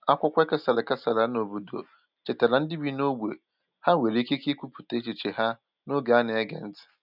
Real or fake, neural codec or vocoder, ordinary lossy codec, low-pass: real; none; none; 5.4 kHz